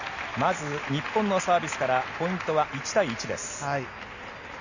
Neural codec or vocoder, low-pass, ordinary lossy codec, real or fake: none; 7.2 kHz; none; real